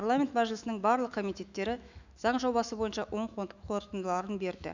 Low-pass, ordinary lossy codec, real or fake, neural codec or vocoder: 7.2 kHz; none; fake; autoencoder, 48 kHz, 128 numbers a frame, DAC-VAE, trained on Japanese speech